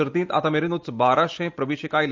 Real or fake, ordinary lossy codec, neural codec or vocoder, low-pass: real; Opus, 24 kbps; none; 7.2 kHz